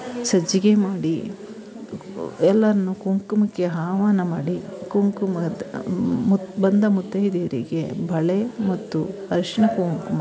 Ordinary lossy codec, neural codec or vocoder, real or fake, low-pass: none; none; real; none